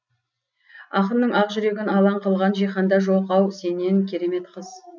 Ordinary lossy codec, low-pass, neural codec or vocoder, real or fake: none; 7.2 kHz; none; real